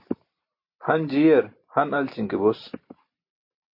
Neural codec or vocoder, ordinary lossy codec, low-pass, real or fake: none; MP3, 32 kbps; 5.4 kHz; real